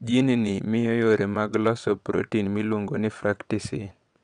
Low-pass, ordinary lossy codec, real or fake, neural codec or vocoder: 9.9 kHz; none; fake; vocoder, 22.05 kHz, 80 mel bands, WaveNeXt